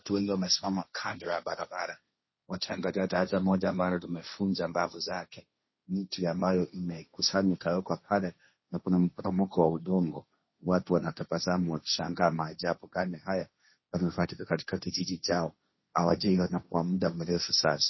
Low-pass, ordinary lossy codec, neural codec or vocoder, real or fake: 7.2 kHz; MP3, 24 kbps; codec, 16 kHz, 1.1 kbps, Voila-Tokenizer; fake